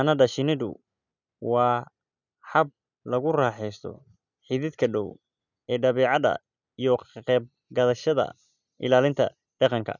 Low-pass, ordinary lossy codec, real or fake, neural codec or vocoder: 7.2 kHz; none; real; none